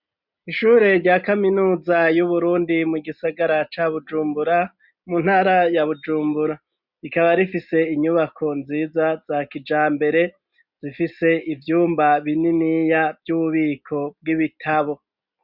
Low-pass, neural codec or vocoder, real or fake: 5.4 kHz; none; real